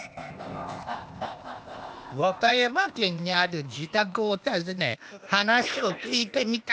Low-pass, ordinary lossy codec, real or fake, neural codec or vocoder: none; none; fake; codec, 16 kHz, 0.8 kbps, ZipCodec